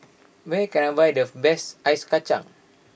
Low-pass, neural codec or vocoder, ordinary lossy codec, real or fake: none; none; none; real